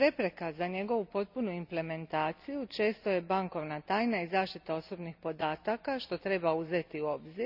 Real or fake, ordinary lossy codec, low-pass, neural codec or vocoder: real; none; 5.4 kHz; none